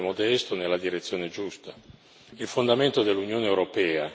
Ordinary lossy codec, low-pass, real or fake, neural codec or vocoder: none; none; real; none